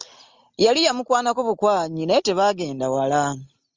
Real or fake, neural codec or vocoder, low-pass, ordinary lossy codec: real; none; 7.2 kHz; Opus, 32 kbps